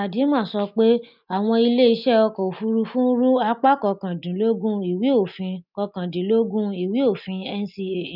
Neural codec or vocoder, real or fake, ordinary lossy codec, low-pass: none; real; none; 5.4 kHz